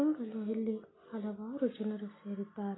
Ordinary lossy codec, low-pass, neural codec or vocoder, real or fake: AAC, 16 kbps; 7.2 kHz; none; real